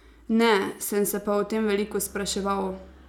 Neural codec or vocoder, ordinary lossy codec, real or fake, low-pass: none; none; real; 19.8 kHz